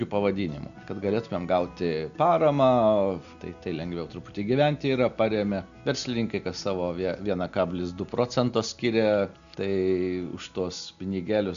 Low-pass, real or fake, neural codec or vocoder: 7.2 kHz; real; none